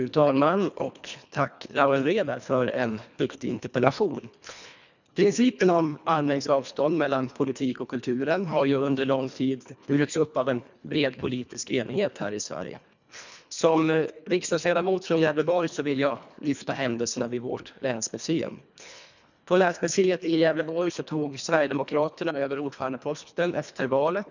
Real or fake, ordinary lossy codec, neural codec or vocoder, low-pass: fake; none; codec, 24 kHz, 1.5 kbps, HILCodec; 7.2 kHz